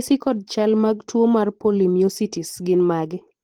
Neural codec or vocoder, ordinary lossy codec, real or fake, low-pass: vocoder, 44.1 kHz, 128 mel bands every 512 samples, BigVGAN v2; Opus, 16 kbps; fake; 19.8 kHz